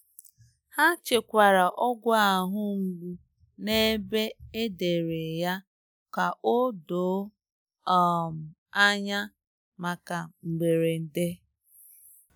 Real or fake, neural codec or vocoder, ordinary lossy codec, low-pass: real; none; none; none